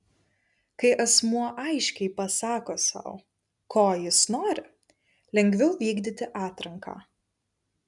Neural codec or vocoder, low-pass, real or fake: none; 10.8 kHz; real